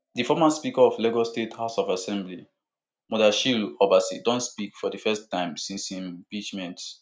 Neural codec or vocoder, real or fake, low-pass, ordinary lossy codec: none; real; none; none